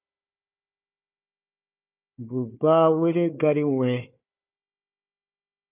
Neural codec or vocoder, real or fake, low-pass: codec, 16 kHz, 4 kbps, FunCodec, trained on Chinese and English, 50 frames a second; fake; 3.6 kHz